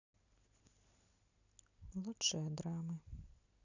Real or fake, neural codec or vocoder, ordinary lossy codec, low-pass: real; none; none; 7.2 kHz